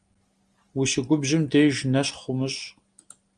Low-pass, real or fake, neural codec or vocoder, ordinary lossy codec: 9.9 kHz; real; none; Opus, 32 kbps